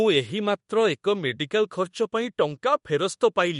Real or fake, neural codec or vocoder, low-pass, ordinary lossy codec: fake; codec, 24 kHz, 1.2 kbps, DualCodec; 10.8 kHz; MP3, 48 kbps